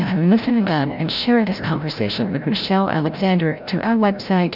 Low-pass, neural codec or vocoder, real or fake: 5.4 kHz; codec, 16 kHz, 0.5 kbps, FreqCodec, larger model; fake